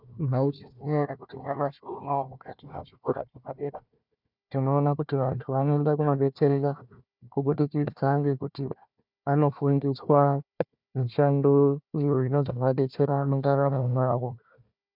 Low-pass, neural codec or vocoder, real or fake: 5.4 kHz; codec, 16 kHz, 1 kbps, FunCodec, trained on Chinese and English, 50 frames a second; fake